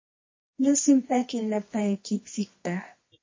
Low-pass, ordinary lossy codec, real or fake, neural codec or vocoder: 7.2 kHz; MP3, 32 kbps; fake; codec, 24 kHz, 0.9 kbps, WavTokenizer, medium music audio release